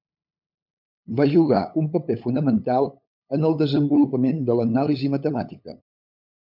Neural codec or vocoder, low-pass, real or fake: codec, 16 kHz, 8 kbps, FunCodec, trained on LibriTTS, 25 frames a second; 5.4 kHz; fake